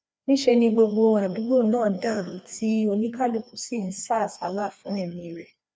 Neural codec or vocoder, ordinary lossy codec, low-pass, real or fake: codec, 16 kHz, 2 kbps, FreqCodec, larger model; none; none; fake